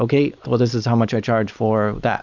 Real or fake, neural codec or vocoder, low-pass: real; none; 7.2 kHz